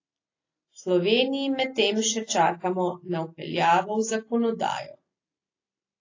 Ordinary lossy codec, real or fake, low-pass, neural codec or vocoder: AAC, 32 kbps; real; 7.2 kHz; none